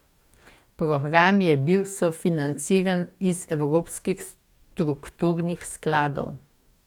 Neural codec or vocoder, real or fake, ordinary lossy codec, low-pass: codec, 44.1 kHz, 2.6 kbps, DAC; fake; none; 19.8 kHz